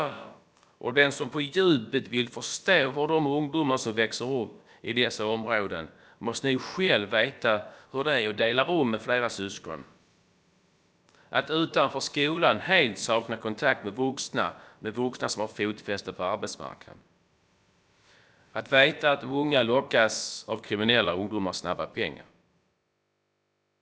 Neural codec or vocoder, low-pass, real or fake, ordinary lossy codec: codec, 16 kHz, about 1 kbps, DyCAST, with the encoder's durations; none; fake; none